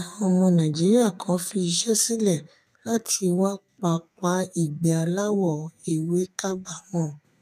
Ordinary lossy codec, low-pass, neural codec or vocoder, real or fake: none; 14.4 kHz; codec, 32 kHz, 1.9 kbps, SNAC; fake